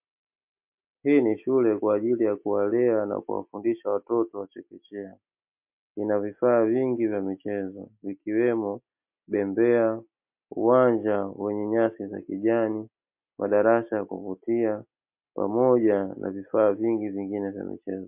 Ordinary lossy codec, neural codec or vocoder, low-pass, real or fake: MP3, 32 kbps; none; 3.6 kHz; real